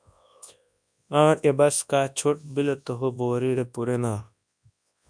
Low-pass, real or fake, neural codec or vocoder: 9.9 kHz; fake; codec, 24 kHz, 0.9 kbps, WavTokenizer, large speech release